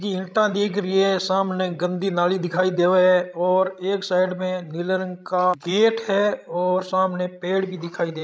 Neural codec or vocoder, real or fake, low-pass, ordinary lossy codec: codec, 16 kHz, 16 kbps, FreqCodec, larger model; fake; none; none